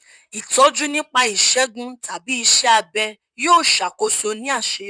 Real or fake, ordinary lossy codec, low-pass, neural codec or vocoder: fake; none; 9.9 kHz; vocoder, 44.1 kHz, 128 mel bands, Pupu-Vocoder